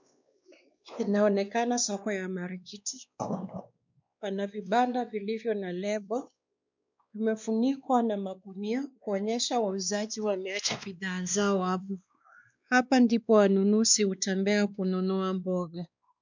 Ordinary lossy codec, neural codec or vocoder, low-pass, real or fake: MP3, 64 kbps; codec, 16 kHz, 2 kbps, X-Codec, WavLM features, trained on Multilingual LibriSpeech; 7.2 kHz; fake